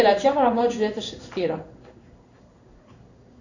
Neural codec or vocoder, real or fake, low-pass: codec, 16 kHz in and 24 kHz out, 1 kbps, XY-Tokenizer; fake; 7.2 kHz